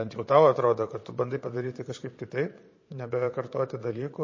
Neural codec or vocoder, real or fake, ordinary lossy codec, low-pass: vocoder, 22.05 kHz, 80 mel bands, Vocos; fake; MP3, 32 kbps; 7.2 kHz